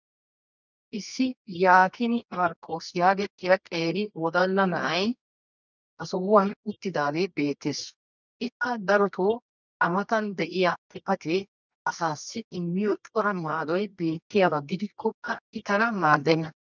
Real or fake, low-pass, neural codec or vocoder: fake; 7.2 kHz; codec, 24 kHz, 0.9 kbps, WavTokenizer, medium music audio release